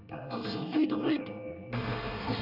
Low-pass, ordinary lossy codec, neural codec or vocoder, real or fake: 5.4 kHz; none; codec, 24 kHz, 1 kbps, SNAC; fake